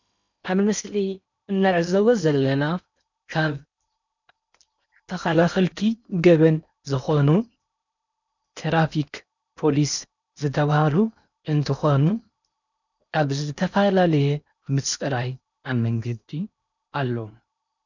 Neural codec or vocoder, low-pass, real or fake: codec, 16 kHz in and 24 kHz out, 0.8 kbps, FocalCodec, streaming, 65536 codes; 7.2 kHz; fake